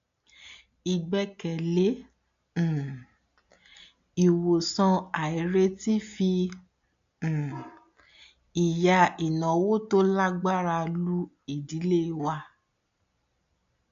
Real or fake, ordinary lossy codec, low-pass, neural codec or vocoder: real; AAC, 64 kbps; 7.2 kHz; none